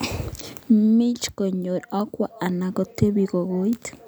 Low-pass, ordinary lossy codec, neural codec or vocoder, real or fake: none; none; none; real